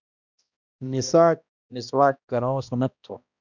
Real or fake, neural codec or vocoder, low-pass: fake; codec, 16 kHz, 1 kbps, X-Codec, HuBERT features, trained on balanced general audio; 7.2 kHz